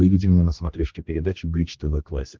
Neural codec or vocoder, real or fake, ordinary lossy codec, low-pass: codec, 16 kHz, 1 kbps, X-Codec, HuBERT features, trained on general audio; fake; Opus, 32 kbps; 7.2 kHz